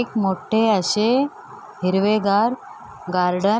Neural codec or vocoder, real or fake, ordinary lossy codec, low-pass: none; real; none; none